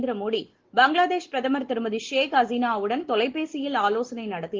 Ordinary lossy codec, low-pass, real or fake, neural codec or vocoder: Opus, 16 kbps; 7.2 kHz; real; none